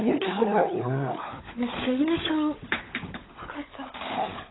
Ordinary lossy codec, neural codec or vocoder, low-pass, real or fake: AAC, 16 kbps; codec, 16 kHz, 16 kbps, FunCodec, trained on LibriTTS, 50 frames a second; 7.2 kHz; fake